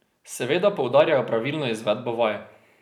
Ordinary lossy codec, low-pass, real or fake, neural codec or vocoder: none; 19.8 kHz; real; none